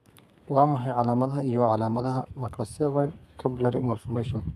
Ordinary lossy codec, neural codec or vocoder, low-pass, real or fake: none; codec, 32 kHz, 1.9 kbps, SNAC; 14.4 kHz; fake